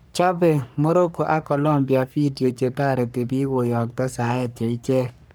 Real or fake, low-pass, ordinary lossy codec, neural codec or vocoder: fake; none; none; codec, 44.1 kHz, 3.4 kbps, Pupu-Codec